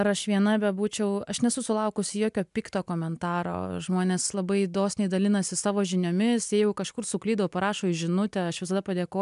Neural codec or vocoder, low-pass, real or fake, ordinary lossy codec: none; 10.8 kHz; real; MP3, 96 kbps